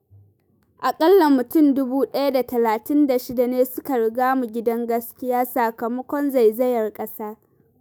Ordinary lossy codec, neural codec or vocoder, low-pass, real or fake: none; autoencoder, 48 kHz, 128 numbers a frame, DAC-VAE, trained on Japanese speech; none; fake